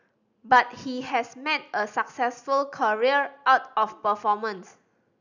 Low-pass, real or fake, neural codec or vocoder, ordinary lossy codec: 7.2 kHz; real; none; none